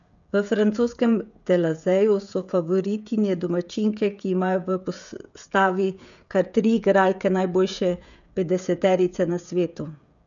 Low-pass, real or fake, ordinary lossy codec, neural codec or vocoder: 7.2 kHz; fake; none; codec, 16 kHz, 16 kbps, FreqCodec, smaller model